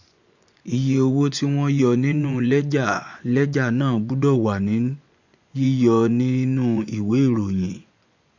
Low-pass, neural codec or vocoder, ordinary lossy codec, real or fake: 7.2 kHz; vocoder, 24 kHz, 100 mel bands, Vocos; none; fake